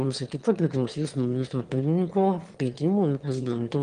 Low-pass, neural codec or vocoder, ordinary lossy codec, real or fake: 9.9 kHz; autoencoder, 22.05 kHz, a latent of 192 numbers a frame, VITS, trained on one speaker; Opus, 24 kbps; fake